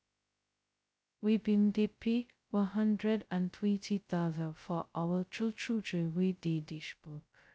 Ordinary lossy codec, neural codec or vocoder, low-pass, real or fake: none; codec, 16 kHz, 0.2 kbps, FocalCodec; none; fake